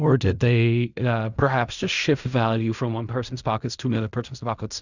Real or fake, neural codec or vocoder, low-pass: fake; codec, 16 kHz in and 24 kHz out, 0.4 kbps, LongCat-Audio-Codec, fine tuned four codebook decoder; 7.2 kHz